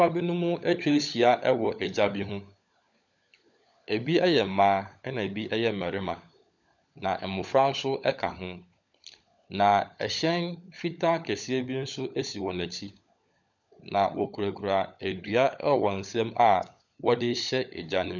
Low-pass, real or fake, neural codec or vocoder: 7.2 kHz; fake; codec, 16 kHz, 16 kbps, FunCodec, trained on LibriTTS, 50 frames a second